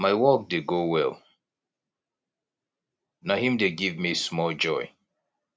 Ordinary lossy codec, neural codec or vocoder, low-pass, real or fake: none; none; none; real